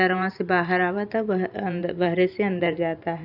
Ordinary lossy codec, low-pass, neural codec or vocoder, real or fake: none; 5.4 kHz; vocoder, 44.1 kHz, 128 mel bands every 512 samples, BigVGAN v2; fake